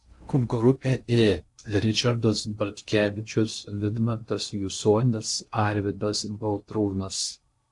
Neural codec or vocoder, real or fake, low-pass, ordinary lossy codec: codec, 16 kHz in and 24 kHz out, 0.6 kbps, FocalCodec, streaming, 4096 codes; fake; 10.8 kHz; AAC, 64 kbps